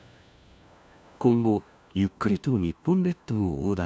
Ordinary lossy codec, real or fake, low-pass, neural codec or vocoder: none; fake; none; codec, 16 kHz, 1 kbps, FunCodec, trained on LibriTTS, 50 frames a second